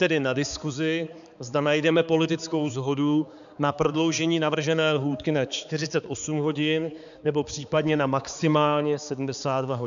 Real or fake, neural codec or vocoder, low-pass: fake; codec, 16 kHz, 4 kbps, X-Codec, HuBERT features, trained on balanced general audio; 7.2 kHz